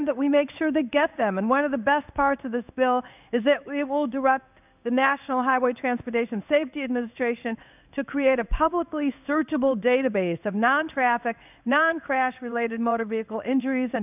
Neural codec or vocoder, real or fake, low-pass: codec, 16 kHz in and 24 kHz out, 1 kbps, XY-Tokenizer; fake; 3.6 kHz